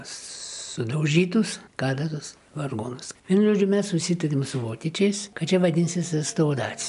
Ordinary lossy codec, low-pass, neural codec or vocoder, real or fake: MP3, 96 kbps; 10.8 kHz; none; real